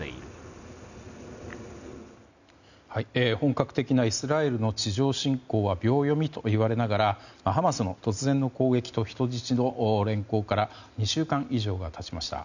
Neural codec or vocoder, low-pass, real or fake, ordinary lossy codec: none; 7.2 kHz; real; none